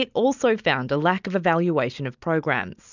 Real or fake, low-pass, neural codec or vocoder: real; 7.2 kHz; none